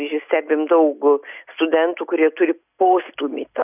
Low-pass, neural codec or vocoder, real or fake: 3.6 kHz; none; real